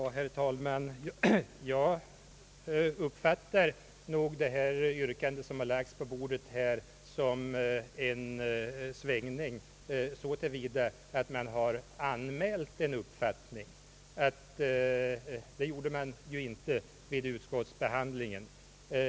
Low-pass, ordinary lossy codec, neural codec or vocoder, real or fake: none; none; none; real